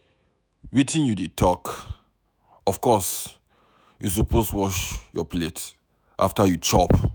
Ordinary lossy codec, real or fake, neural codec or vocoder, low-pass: none; fake; autoencoder, 48 kHz, 128 numbers a frame, DAC-VAE, trained on Japanese speech; none